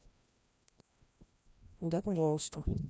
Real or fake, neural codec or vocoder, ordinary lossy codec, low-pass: fake; codec, 16 kHz, 1 kbps, FreqCodec, larger model; none; none